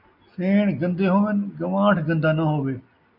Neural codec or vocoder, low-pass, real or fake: none; 5.4 kHz; real